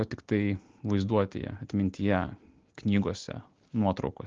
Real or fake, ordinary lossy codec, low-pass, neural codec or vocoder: real; Opus, 16 kbps; 7.2 kHz; none